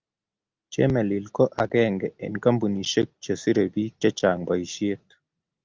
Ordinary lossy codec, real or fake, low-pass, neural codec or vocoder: Opus, 32 kbps; real; 7.2 kHz; none